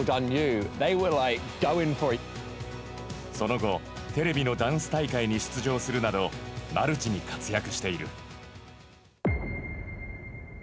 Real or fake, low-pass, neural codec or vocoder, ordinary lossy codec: real; none; none; none